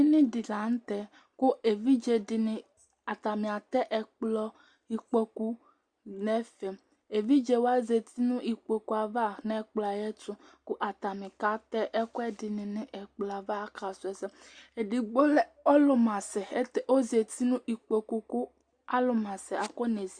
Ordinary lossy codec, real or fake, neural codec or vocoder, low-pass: Opus, 64 kbps; real; none; 9.9 kHz